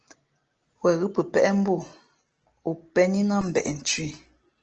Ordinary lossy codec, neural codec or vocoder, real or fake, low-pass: Opus, 16 kbps; none; real; 7.2 kHz